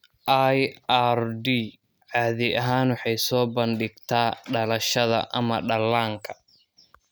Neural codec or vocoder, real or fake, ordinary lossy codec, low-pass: none; real; none; none